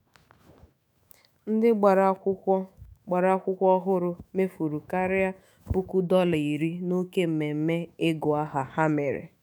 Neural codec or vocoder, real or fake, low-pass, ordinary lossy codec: autoencoder, 48 kHz, 128 numbers a frame, DAC-VAE, trained on Japanese speech; fake; none; none